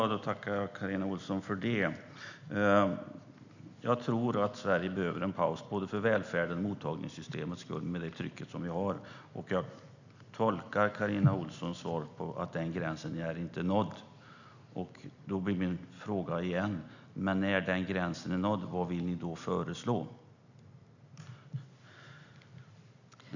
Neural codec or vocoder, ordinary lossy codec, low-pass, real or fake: none; none; 7.2 kHz; real